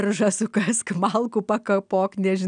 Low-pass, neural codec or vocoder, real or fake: 9.9 kHz; none; real